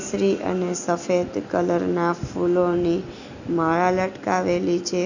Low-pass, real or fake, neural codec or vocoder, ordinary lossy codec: 7.2 kHz; real; none; none